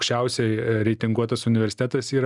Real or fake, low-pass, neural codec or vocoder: real; 10.8 kHz; none